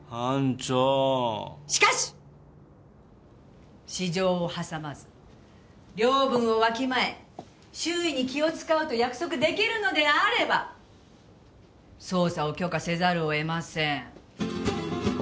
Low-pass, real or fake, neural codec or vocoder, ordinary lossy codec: none; real; none; none